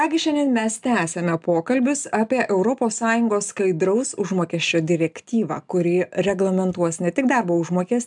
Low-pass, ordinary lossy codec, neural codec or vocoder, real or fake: 10.8 kHz; MP3, 96 kbps; none; real